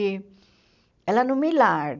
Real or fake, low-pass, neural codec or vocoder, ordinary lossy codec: real; 7.2 kHz; none; none